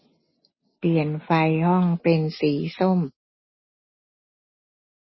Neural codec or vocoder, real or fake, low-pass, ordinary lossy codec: none; real; 7.2 kHz; MP3, 24 kbps